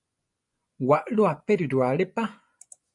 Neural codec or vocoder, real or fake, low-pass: vocoder, 24 kHz, 100 mel bands, Vocos; fake; 10.8 kHz